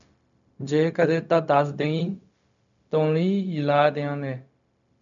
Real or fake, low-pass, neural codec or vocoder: fake; 7.2 kHz; codec, 16 kHz, 0.4 kbps, LongCat-Audio-Codec